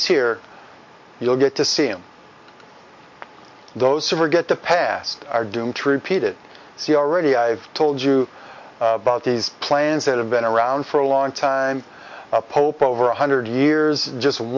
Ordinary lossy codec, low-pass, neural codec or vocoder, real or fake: MP3, 48 kbps; 7.2 kHz; none; real